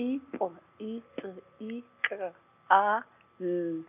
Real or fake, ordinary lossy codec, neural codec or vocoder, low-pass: real; none; none; 3.6 kHz